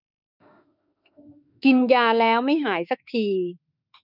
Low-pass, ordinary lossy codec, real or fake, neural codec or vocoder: 5.4 kHz; none; fake; autoencoder, 48 kHz, 32 numbers a frame, DAC-VAE, trained on Japanese speech